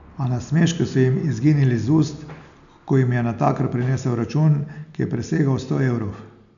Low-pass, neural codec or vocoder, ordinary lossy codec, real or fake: 7.2 kHz; none; none; real